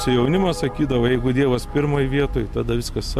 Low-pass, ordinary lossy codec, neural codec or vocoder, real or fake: 14.4 kHz; MP3, 64 kbps; none; real